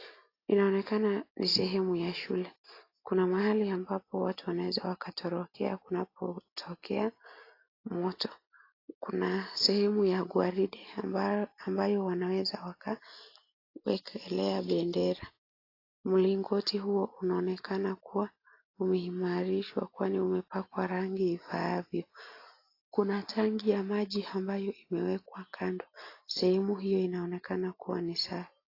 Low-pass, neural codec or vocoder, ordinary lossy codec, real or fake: 5.4 kHz; none; AAC, 32 kbps; real